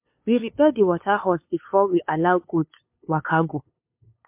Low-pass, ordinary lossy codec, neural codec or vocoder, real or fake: 3.6 kHz; MP3, 32 kbps; codec, 16 kHz, 2 kbps, FunCodec, trained on LibriTTS, 25 frames a second; fake